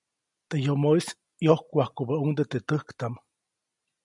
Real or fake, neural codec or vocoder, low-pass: real; none; 10.8 kHz